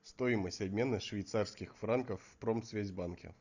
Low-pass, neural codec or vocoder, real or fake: 7.2 kHz; none; real